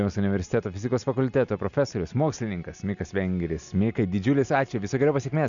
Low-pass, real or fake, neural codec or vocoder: 7.2 kHz; real; none